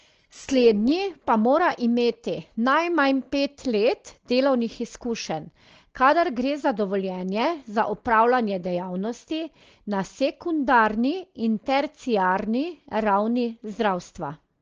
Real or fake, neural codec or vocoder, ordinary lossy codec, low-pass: real; none; Opus, 16 kbps; 7.2 kHz